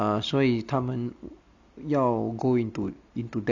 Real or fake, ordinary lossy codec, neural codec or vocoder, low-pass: real; none; none; 7.2 kHz